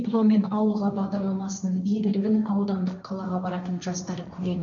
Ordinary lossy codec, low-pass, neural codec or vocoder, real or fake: none; 7.2 kHz; codec, 16 kHz, 1.1 kbps, Voila-Tokenizer; fake